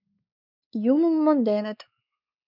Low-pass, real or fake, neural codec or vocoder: 5.4 kHz; fake; codec, 16 kHz, 4 kbps, X-Codec, WavLM features, trained on Multilingual LibriSpeech